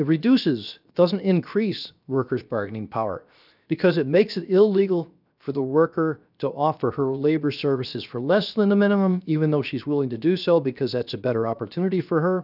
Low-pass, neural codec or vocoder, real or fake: 5.4 kHz; codec, 16 kHz, 0.7 kbps, FocalCodec; fake